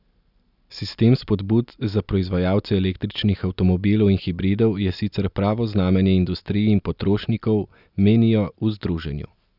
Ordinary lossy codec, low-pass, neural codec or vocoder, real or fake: none; 5.4 kHz; none; real